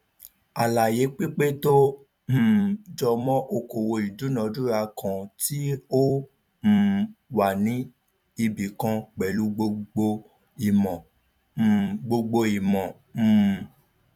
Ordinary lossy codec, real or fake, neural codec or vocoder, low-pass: none; fake; vocoder, 44.1 kHz, 128 mel bands every 256 samples, BigVGAN v2; 19.8 kHz